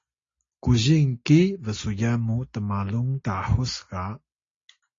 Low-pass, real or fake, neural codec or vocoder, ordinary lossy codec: 7.2 kHz; real; none; AAC, 32 kbps